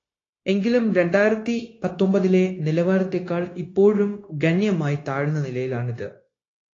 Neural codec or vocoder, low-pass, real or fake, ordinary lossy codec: codec, 16 kHz, 0.9 kbps, LongCat-Audio-Codec; 7.2 kHz; fake; AAC, 32 kbps